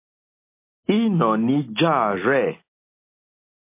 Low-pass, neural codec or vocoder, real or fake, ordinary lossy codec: 3.6 kHz; none; real; MP3, 24 kbps